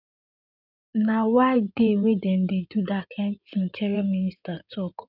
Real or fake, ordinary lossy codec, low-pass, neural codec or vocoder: fake; none; 5.4 kHz; codec, 16 kHz in and 24 kHz out, 2.2 kbps, FireRedTTS-2 codec